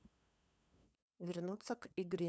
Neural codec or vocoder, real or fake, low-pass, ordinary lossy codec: codec, 16 kHz, 2 kbps, FunCodec, trained on LibriTTS, 25 frames a second; fake; none; none